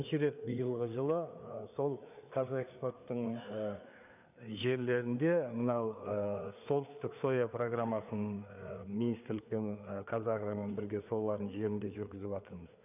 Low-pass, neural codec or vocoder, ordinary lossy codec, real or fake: 3.6 kHz; codec, 16 kHz, 4 kbps, FreqCodec, larger model; none; fake